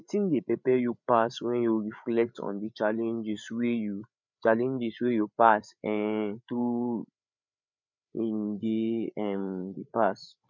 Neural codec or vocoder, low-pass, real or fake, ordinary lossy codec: codec, 16 kHz, 8 kbps, FreqCodec, larger model; 7.2 kHz; fake; none